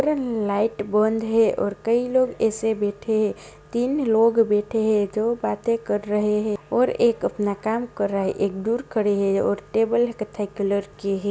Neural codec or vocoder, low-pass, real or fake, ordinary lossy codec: none; none; real; none